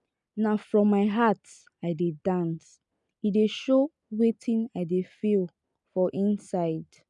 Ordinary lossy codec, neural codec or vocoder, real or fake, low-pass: none; none; real; 10.8 kHz